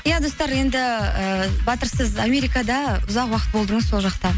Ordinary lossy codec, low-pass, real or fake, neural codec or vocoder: none; none; real; none